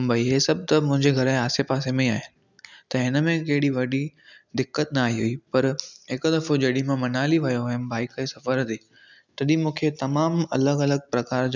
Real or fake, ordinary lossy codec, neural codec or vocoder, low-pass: real; none; none; 7.2 kHz